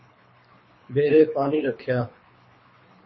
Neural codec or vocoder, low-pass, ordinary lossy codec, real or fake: codec, 24 kHz, 3 kbps, HILCodec; 7.2 kHz; MP3, 24 kbps; fake